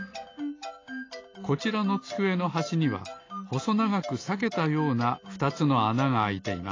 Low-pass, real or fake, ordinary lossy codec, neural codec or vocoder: 7.2 kHz; real; AAC, 32 kbps; none